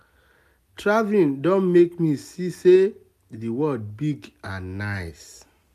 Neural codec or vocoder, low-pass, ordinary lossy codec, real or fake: none; 14.4 kHz; none; real